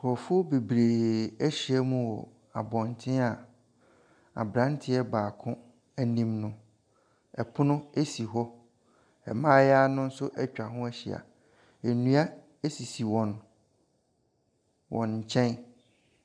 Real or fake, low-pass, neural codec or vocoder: real; 9.9 kHz; none